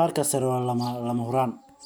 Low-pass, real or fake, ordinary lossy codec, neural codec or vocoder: none; real; none; none